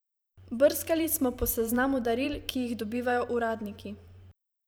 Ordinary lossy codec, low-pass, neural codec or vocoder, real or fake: none; none; vocoder, 44.1 kHz, 128 mel bands every 512 samples, BigVGAN v2; fake